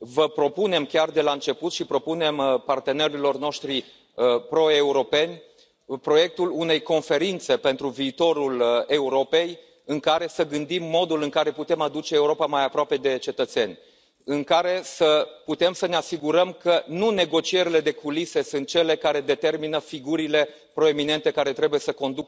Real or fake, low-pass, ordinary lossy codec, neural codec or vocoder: real; none; none; none